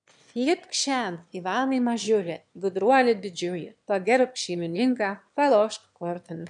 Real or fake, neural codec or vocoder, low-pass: fake; autoencoder, 22.05 kHz, a latent of 192 numbers a frame, VITS, trained on one speaker; 9.9 kHz